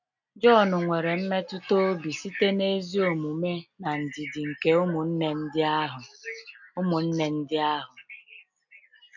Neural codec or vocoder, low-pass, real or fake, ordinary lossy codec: none; 7.2 kHz; real; none